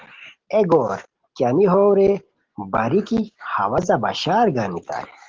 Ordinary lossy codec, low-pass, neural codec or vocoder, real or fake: Opus, 16 kbps; 7.2 kHz; none; real